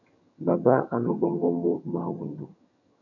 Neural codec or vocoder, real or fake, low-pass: vocoder, 22.05 kHz, 80 mel bands, HiFi-GAN; fake; 7.2 kHz